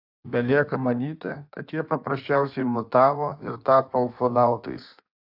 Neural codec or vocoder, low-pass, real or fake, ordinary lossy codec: codec, 16 kHz in and 24 kHz out, 1.1 kbps, FireRedTTS-2 codec; 5.4 kHz; fake; AAC, 48 kbps